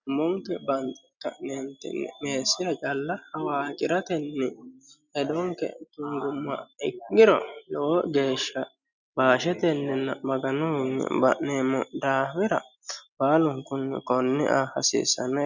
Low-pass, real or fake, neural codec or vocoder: 7.2 kHz; real; none